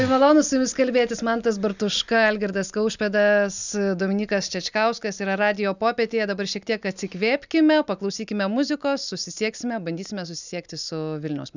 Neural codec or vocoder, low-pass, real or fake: none; 7.2 kHz; real